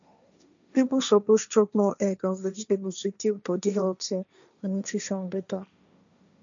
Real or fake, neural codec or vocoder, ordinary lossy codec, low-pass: fake; codec, 16 kHz, 1.1 kbps, Voila-Tokenizer; none; 7.2 kHz